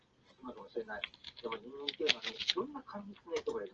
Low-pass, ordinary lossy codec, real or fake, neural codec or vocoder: 7.2 kHz; Opus, 16 kbps; real; none